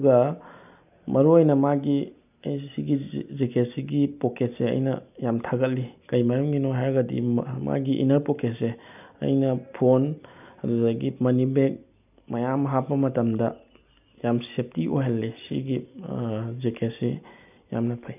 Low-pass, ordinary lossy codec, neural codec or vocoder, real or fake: 3.6 kHz; none; none; real